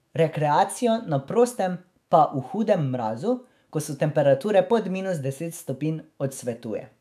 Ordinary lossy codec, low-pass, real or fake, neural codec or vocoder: none; 14.4 kHz; fake; autoencoder, 48 kHz, 128 numbers a frame, DAC-VAE, trained on Japanese speech